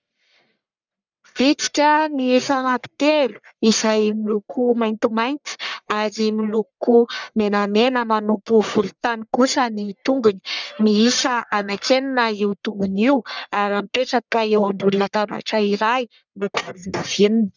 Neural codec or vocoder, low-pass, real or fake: codec, 44.1 kHz, 1.7 kbps, Pupu-Codec; 7.2 kHz; fake